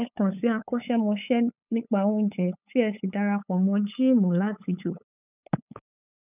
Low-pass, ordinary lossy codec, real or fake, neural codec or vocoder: 3.6 kHz; none; fake; codec, 16 kHz, 8 kbps, FunCodec, trained on LibriTTS, 25 frames a second